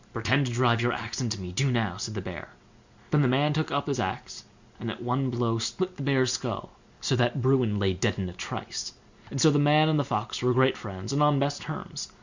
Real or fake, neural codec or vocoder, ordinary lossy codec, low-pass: real; none; Opus, 64 kbps; 7.2 kHz